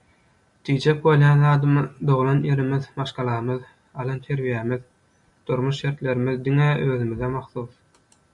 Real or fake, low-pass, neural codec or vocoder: real; 10.8 kHz; none